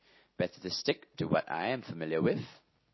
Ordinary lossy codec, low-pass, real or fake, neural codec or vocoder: MP3, 24 kbps; 7.2 kHz; real; none